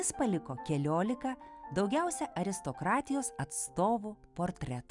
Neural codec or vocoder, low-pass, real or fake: none; 10.8 kHz; real